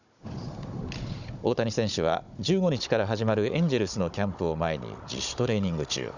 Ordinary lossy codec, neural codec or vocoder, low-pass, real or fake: none; codec, 16 kHz, 16 kbps, FunCodec, trained on Chinese and English, 50 frames a second; 7.2 kHz; fake